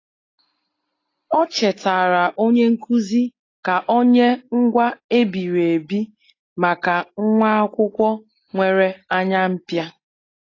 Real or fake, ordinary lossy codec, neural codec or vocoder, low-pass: real; AAC, 32 kbps; none; 7.2 kHz